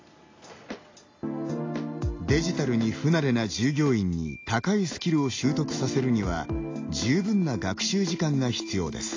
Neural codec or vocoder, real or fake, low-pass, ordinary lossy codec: none; real; 7.2 kHz; AAC, 32 kbps